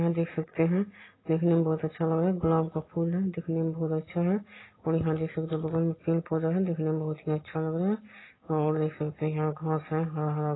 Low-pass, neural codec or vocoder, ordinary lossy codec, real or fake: 7.2 kHz; none; AAC, 16 kbps; real